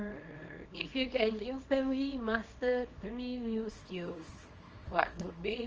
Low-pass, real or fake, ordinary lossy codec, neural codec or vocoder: 7.2 kHz; fake; Opus, 24 kbps; codec, 24 kHz, 0.9 kbps, WavTokenizer, small release